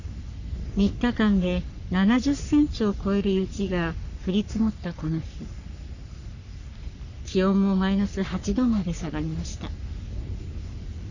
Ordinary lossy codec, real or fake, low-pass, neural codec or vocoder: none; fake; 7.2 kHz; codec, 44.1 kHz, 3.4 kbps, Pupu-Codec